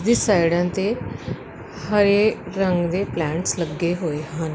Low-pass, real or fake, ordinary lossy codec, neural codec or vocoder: none; real; none; none